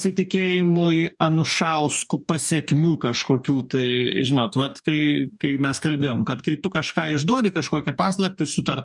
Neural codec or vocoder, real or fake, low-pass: codec, 44.1 kHz, 2.6 kbps, DAC; fake; 10.8 kHz